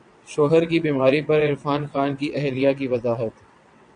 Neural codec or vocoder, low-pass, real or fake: vocoder, 22.05 kHz, 80 mel bands, WaveNeXt; 9.9 kHz; fake